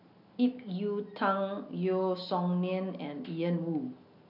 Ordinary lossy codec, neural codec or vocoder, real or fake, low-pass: none; none; real; 5.4 kHz